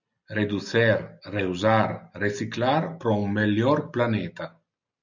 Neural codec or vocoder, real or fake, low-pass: none; real; 7.2 kHz